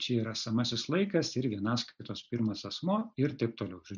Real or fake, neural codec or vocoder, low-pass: real; none; 7.2 kHz